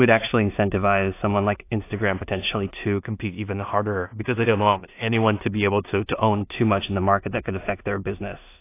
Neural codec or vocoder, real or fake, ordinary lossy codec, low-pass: codec, 16 kHz in and 24 kHz out, 0.4 kbps, LongCat-Audio-Codec, two codebook decoder; fake; AAC, 24 kbps; 3.6 kHz